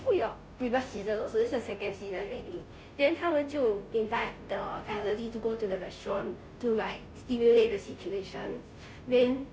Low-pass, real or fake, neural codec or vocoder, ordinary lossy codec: none; fake; codec, 16 kHz, 0.5 kbps, FunCodec, trained on Chinese and English, 25 frames a second; none